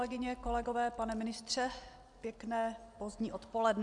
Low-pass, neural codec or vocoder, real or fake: 10.8 kHz; none; real